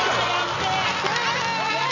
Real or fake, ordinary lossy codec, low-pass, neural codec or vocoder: real; none; 7.2 kHz; none